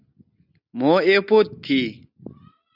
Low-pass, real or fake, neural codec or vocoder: 5.4 kHz; real; none